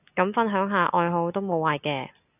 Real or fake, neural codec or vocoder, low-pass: real; none; 3.6 kHz